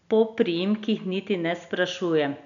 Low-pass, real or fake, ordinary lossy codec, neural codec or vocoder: 7.2 kHz; real; none; none